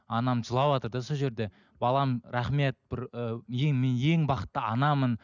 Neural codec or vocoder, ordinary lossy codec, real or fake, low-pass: none; none; real; 7.2 kHz